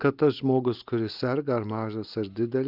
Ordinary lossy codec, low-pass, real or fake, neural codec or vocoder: Opus, 24 kbps; 5.4 kHz; real; none